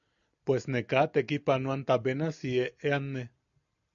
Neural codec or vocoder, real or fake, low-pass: none; real; 7.2 kHz